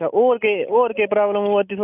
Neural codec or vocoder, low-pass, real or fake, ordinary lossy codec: none; 3.6 kHz; real; none